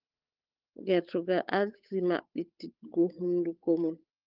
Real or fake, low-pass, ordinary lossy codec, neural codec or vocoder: fake; 5.4 kHz; Opus, 24 kbps; codec, 16 kHz, 8 kbps, FunCodec, trained on Chinese and English, 25 frames a second